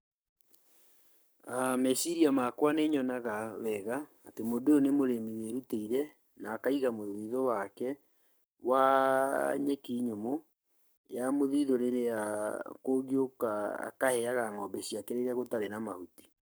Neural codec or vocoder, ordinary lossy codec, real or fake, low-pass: codec, 44.1 kHz, 7.8 kbps, Pupu-Codec; none; fake; none